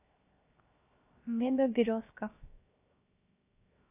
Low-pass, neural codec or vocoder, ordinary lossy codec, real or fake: 3.6 kHz; codec, 16 kHz, 0.7 kbps, FocalCodec; AAC, 32 kbps; fake